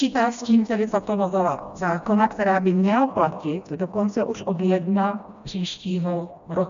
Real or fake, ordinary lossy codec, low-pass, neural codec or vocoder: fake; MP3, 64 kbps; 7.2 kHz; codec, 16 kHz, 1 kbps, FreqCodec, smaller model